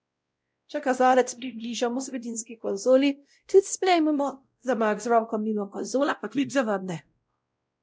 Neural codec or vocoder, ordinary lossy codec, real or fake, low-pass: codec, 16 kHz, 0.5 kbps, X-Codec, WavLM features, trained on Multilingual LibriSpeech; none; fake; none